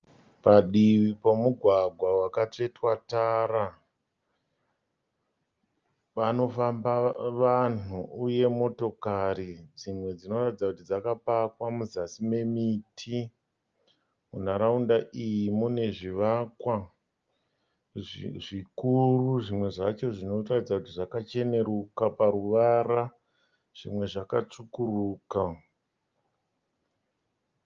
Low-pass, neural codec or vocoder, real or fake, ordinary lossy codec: 7.2 kHz; none; real; Opus, 24 kbps